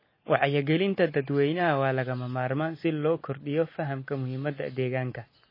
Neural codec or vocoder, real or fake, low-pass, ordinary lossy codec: none; real; 5.4 kHz; MP3, 24 kbps